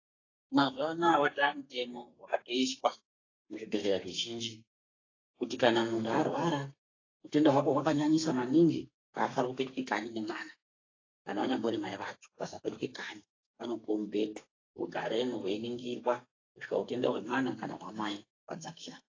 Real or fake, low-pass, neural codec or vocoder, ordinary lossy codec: fake; 7.2 kHz; codec, 32 kHz, 1.9 kbps, SNAC; AAC, 32 kbps